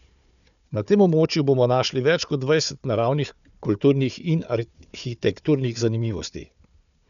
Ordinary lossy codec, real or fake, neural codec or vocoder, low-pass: none; fake; codec, 16 kHz, 4 kbps, FunCodec, trained on Chinese and English, 50 frames a second; 7.2 kHz